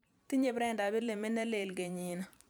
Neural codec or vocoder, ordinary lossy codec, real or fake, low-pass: vocoder, 44.1 kHz, 128 mel bands every 512 samples, BigVGAN v2; none; fake; none